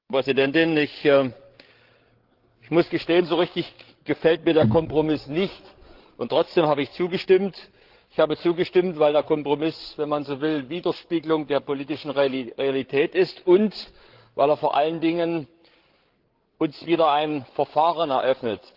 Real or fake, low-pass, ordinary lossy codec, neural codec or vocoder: fake; 5.4 kHz; Opus, 16 kbps; codec, 16 kHz, 16 kbps, FreqCodec, larger model